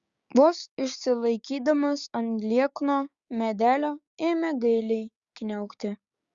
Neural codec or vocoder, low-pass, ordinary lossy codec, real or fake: codec, 16 kHz, 6 kbps, DAC; 7.2 kHz; Opus, 64 kbps; fake